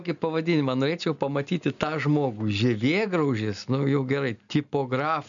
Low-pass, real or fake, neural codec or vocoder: 7.2 kHz; real; none